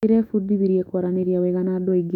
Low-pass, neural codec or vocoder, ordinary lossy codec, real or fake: 19.8 kHz; autoencoder, 48 kHz, 128 numbers a frame, DAC-VAE, trained on Japanese speech; none; fake